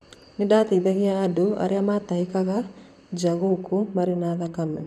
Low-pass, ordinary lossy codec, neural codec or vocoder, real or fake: 14.4 kHz; none; vocoder, 44.1 kHz, 128 mel bands, Pupu-Vocoder; fake